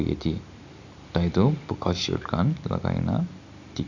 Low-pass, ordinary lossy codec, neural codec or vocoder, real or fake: 7.2 kHz; none; none; real